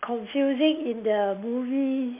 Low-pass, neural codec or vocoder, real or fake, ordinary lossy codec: 3.6 kHz; none; real; MP3, 24 kbps